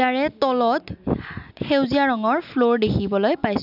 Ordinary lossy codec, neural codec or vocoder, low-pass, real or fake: none; none; 5.4 kHz; real